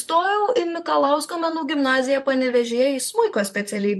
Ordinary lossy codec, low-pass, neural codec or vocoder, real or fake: MP3, 64 kbps; 14.4 kHz; vocoder, 44.1 kHz, 128 mel bands, Pupu-Vocoder; fake